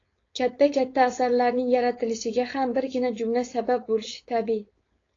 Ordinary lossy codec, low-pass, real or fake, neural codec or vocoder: AAC, 32 kbps; 7.2 kHz; fake; codec, 16 kHz, 4.8 kbps, FACodec